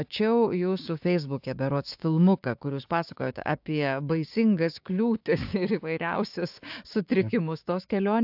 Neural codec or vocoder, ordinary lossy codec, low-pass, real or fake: codec, 16 kHz, 6 kbps, DAC; AAC, 48 kbps; 5.4 kHz; fake